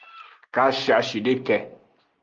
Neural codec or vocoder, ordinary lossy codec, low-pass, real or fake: none; Opus, 32 kbps; 7.2 kHz; real